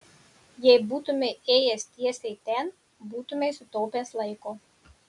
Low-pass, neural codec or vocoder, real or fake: 10.8 kHz; none; real